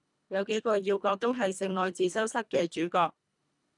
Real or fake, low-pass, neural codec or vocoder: fake; 10.8 kHz; codec, 24 kHz, 1.5 kbps, HILCodec